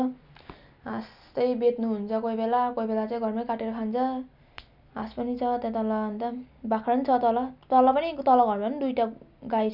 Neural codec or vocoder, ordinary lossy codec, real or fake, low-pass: none; none; real; 5.4 kHz